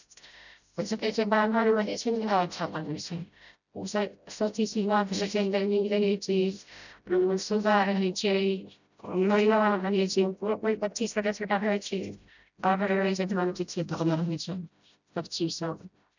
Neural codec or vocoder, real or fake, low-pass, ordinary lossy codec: codec, 16 kHz, 0.5 kbps, FreqCodec, smaller model; fake; 7.2 kHz; none